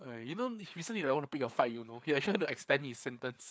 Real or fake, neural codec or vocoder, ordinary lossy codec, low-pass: fake; codec, 16 kHz, 4 kbps, FreqCodec, larger model; none; none